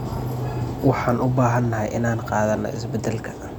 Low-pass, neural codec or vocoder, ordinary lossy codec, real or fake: 19.8 kHz; none; none; real